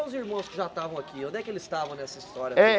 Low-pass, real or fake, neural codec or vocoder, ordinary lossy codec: none; real; none; none